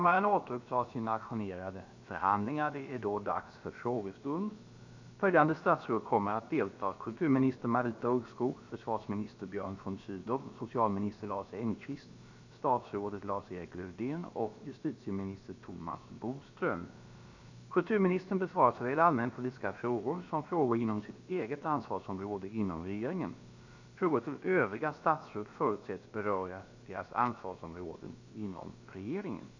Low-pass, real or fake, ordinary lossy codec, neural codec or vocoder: 7.2 kHz; fake; AAC, 48 kbps; codec, 16 kHz, about 1 kbps, DyCAST, with the encoder's durations